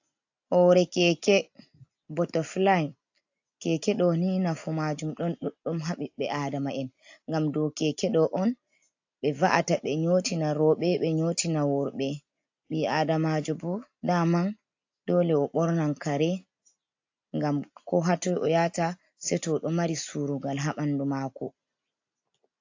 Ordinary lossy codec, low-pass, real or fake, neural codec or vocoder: AAC, 48 kbps; 7.2 kHz; real; none